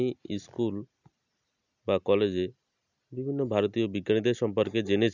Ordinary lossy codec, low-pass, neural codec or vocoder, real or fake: none; 7.2 kHz; none; real